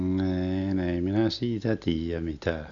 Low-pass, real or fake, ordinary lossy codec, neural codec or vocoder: 7.2 kHz; real; none; none